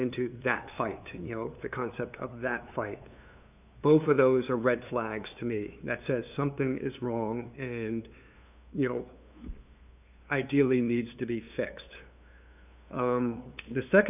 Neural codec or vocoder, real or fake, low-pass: codec, 16 kHz, 2 kbps, FunCodec, trained on LibriTTS, 25 frames a second; fake; 3.6 kHz